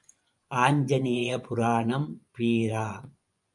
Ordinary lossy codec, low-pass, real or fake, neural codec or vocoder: MP3, 96 kbps; 10.8 kHz; fake; vocoder, 24 kHz, 100 mel bands, Vocos